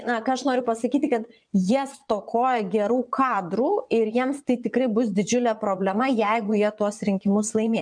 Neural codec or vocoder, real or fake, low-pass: vocoder, 22.05 kHz, 80 mel bands, Vocos; fake; 9.9 kHz